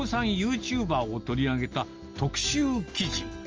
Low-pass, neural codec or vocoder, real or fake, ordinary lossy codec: 7.2 kHz; none; real; Opus, 24 kbps